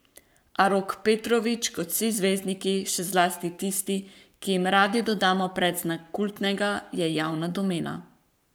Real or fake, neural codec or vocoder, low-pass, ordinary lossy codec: fake; codec, 44.1 kHz, 7.8 kbps, Pupu-Codec; none; none